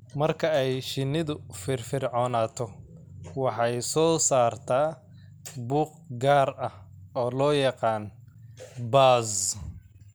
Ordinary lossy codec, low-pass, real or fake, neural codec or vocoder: none; none; real; none